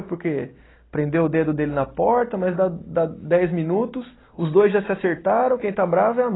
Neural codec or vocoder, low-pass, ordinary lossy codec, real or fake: none; 7.2 kHz; AAC, 16 kbps; real